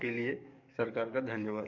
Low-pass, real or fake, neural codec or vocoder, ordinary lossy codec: 7.2 kHz; fake; codec, 44.1 kHz, 7.8 kbps, DAC; MP3, 48 kbps